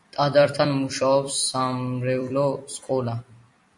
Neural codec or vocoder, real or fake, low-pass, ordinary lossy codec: vocoder, 44.1 kHz, 128 mel bands every 256 samples, BigVGAN v2; fake; 10.8 kHz; MP3, 48 kbps